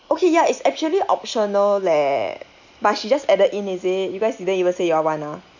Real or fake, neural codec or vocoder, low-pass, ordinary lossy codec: real; none; 7.2 kHz; none